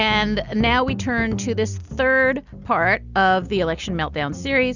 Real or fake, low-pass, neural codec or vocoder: real; 7.2 kHz; none